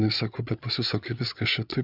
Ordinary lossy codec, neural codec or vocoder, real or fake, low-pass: Opus, 64 kbps; codec, 16 kHz, 8 kbps, FreqCodec, larger model; fake; 5.4 kHz